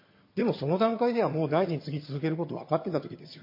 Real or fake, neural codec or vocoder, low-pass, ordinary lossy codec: fake; vocoder, 22.05 kHz, 80 mel bands, HiFi-GAN; 5.4 kHz; MP3, 24 kbps